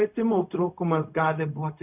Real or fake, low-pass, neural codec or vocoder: fake; 3.6 kHz; codec, 16 kHz, 0.4 kbps, LongCat-Audio-Codec